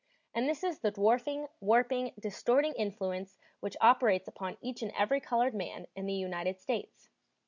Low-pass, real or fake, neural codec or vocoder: 7.2 kHz; real; none